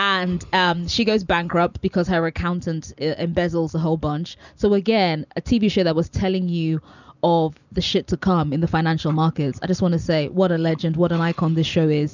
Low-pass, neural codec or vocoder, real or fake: 7.2 kHz; none; real